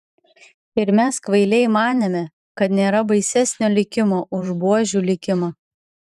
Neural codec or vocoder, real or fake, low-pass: vocoder, 44.1 kHz, 128 mel bands every 512 samples, BigVGAN v2; fake; 14.4 kHz